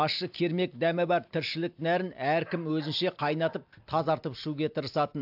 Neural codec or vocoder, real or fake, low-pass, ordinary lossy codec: none; real; 5.4 kHz; AAC, 48 kbps